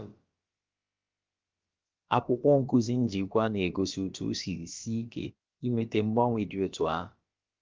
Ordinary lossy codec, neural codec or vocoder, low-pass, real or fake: Opus, 24 kbps; codec, 16 kHz, about 1 kbps, DyCAST, with the encoder's durations; 7.2 kHz; fake